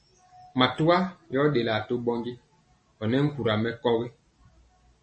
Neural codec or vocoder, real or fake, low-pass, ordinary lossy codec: none; real; 10.8 kHz; MP3, 32 kbps